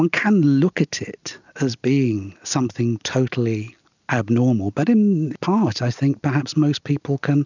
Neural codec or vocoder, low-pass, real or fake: none; 7.2 kHz; real